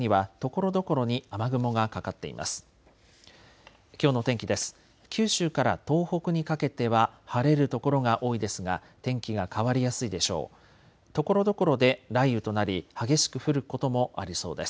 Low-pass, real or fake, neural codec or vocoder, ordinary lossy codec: none; real; none; none